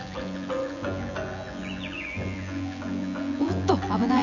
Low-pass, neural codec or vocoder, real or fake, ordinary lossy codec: 7.2 kHz; vocoder, 24 kHz, 100 mel bands, Vocos; fake; none